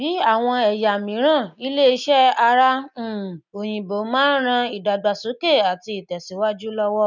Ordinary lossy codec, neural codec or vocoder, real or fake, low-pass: none; none; real; 7.2 kHz